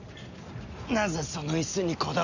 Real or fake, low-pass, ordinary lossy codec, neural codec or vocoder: real; 7.2 kHz; none; none